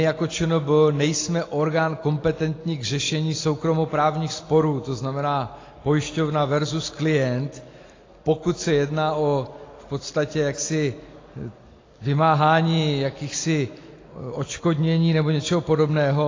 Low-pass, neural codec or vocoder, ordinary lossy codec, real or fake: 7.2 kHz; none; AAC, 32 kbps; real